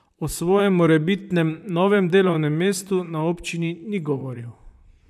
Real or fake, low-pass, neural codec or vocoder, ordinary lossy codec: fake; 14.4 kHz; vocoder, 44.1 kHz, 128 mel bands, Pupu-Vocoder; none